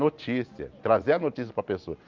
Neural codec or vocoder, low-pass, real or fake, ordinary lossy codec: none; 7.2 kHz; real; Opus, 32 kbps